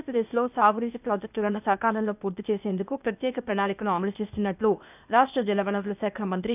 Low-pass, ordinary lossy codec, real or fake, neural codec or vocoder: 3.6 kHz; none; fake; codec, 16 kHz, 0.8 kbps, ZipCodec